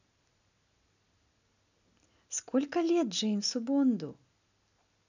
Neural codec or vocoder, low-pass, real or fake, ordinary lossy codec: none; 7.2 kHz; real; none